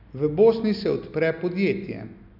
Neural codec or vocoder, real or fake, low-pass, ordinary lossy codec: none; real; 5.4 kHz; none